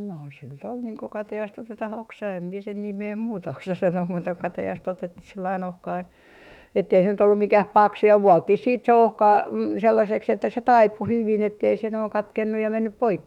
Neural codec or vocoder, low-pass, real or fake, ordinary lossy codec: autoencoder, 48 kHz, 32 numbers a frame, DAC-VAE, trained on Japanese speech; 19.8 kHz; fake; none